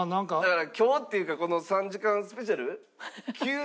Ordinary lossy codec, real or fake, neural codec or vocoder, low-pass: none; real; none; none